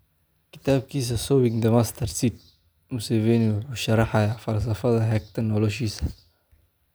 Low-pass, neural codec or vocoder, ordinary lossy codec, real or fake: none; none; none; real